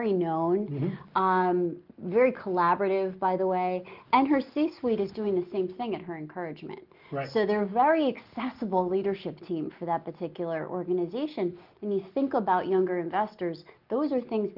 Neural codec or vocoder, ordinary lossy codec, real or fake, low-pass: none; Opus, 32 kbps; real; 5.4 kHz